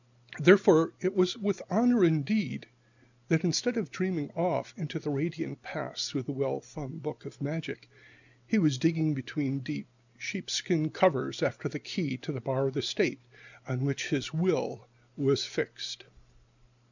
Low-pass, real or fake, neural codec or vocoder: 7.2 kHz; real; none